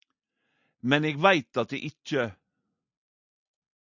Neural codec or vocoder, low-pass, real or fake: none; 7.2 kHz; real